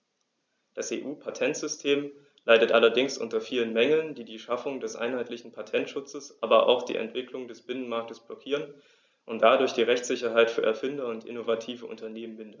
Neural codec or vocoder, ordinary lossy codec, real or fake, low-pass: none; none; real; none